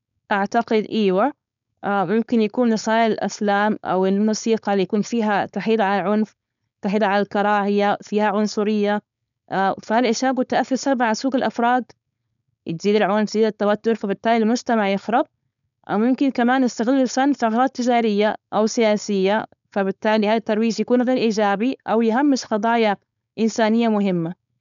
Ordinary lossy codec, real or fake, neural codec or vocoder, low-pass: none; fake; codec, 16 kHz, 4.8 kbps, FACodec; 7.2 kHz